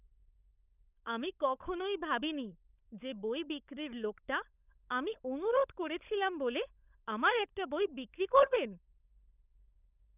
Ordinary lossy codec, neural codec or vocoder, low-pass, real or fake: none; codec, 16 kHz, 16 kbps, FunCodec, trained on LibriTTS, 50 frames a second; 3.6 kHz; fake